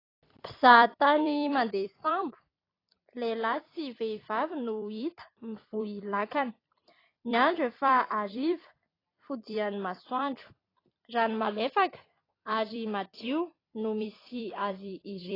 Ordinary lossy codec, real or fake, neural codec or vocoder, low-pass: AAC, 24 kbps; fake; vocoder, 44.1 kHz, 128 mel bands every 256 samples, BigVGAN v2; 5.4 kHz